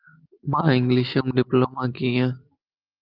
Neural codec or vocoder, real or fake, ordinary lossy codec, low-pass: none; real; Opus, 32 kbps; 5.4 kHz